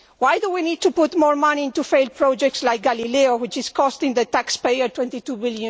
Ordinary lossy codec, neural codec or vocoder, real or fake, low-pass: none; none; real; none